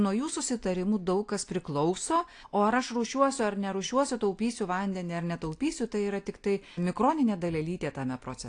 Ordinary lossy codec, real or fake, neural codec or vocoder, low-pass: AAC, 48 kbps; real; none; 9.9 kHz